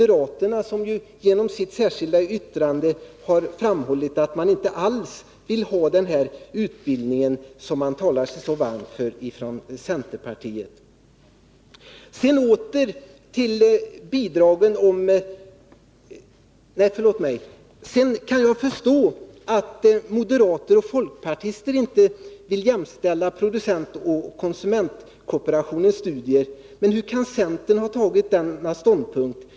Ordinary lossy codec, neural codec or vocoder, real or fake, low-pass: none; none; real; none